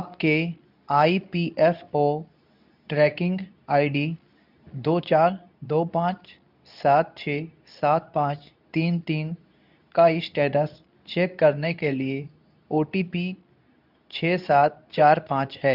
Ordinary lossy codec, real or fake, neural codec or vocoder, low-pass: none; fake; codec, 24 kHz, 0.9 kbps, WavTokenizer, medium speech release version 2; 5.4 kHz